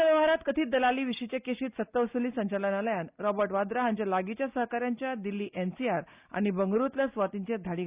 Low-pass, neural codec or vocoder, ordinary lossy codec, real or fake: 3.6 kHz; none; Opus, 64 kbps; real